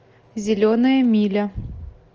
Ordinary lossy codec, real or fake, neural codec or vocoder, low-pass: Opus, 24 kbps; real; none; 7.2 kHz